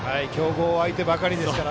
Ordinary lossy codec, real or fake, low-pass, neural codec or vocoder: none; real; none; none